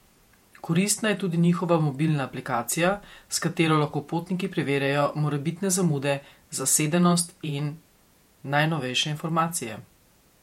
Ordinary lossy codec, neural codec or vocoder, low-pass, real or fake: MP3, 64 kbps; vocoder, 48 kHz, 128 mel bands, Vocos; 19.8 kHz; fake